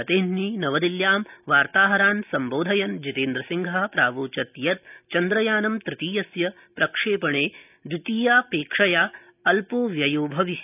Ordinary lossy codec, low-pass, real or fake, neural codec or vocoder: none; 3.6 kHz; real; none